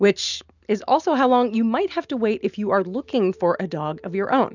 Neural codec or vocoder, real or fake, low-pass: none; real; 7.2 kHz